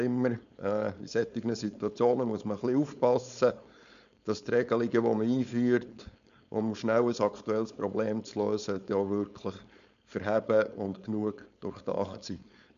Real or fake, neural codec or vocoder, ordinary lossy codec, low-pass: fake; codec, 16 kHz, 4.8 kbps, FACodec; none; 7.2 kHz